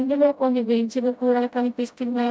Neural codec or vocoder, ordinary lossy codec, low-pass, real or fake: codec, 16 kHz, 0.5 kbps, FreqCodec, smaller model; none; none; fake